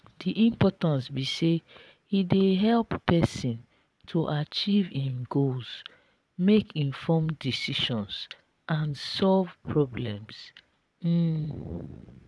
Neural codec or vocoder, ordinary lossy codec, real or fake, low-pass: vocoder, 22.05 kHz, 80 mel bands, Vocos; none; fake; none